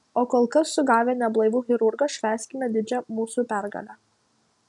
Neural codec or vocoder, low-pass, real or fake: none; 10.8 kHz; real